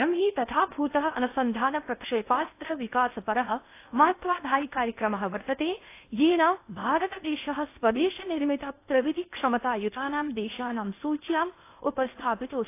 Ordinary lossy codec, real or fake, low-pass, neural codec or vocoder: AAC, 24 kbps; fake; 3.6 kHz; codec, 16 kHz in and 24 kHz out, 0.8 kbps, FocalCodec, streaming, 65536 codes